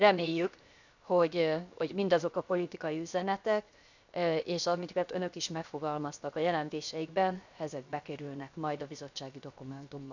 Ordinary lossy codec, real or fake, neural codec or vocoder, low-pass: none; fake; codec, 16 kHz, 0.7 kbps, FocalCodec; 7.2 kHz